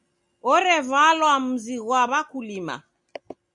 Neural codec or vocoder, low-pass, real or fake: none; 10.8 kHz; real